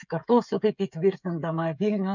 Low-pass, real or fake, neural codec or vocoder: 7.2 kHz; fake; codec, 16 kHz, 16 kbps, FreqCodec, smaller model